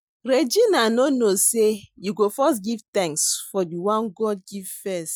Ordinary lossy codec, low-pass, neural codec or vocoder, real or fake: none; none; none; real